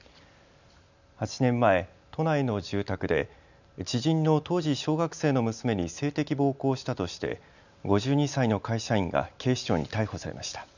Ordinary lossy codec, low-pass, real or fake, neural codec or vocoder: none; 7.2 kHz; real; none